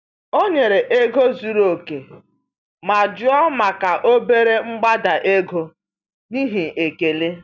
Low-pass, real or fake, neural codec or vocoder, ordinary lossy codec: 7.2 kHz; real; none; none